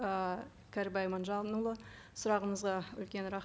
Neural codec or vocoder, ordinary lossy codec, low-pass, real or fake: none; none; none; real